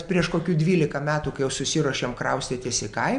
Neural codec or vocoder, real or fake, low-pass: none; real; 9.9 kHz